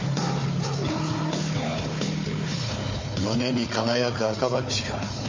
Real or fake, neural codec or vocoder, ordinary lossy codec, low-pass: fake; codec, 16 kHz, 4 kbps, FunCodec, trained on Chinese and English, 50 frames a second; MP3, 32 kbps; 7.2 kHz